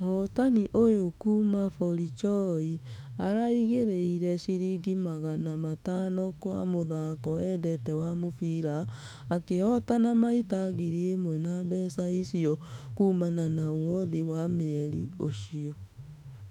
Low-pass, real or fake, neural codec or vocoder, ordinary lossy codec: 19.8 kHz; fake; autoencoder, 48 kHz, 32 numbers a frame, DAC-VAE, trained on Japanese speech; none